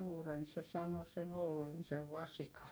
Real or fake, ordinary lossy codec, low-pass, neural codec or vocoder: fake; none; none; codec, 44.1 kHz, 2.6 kbps, DAC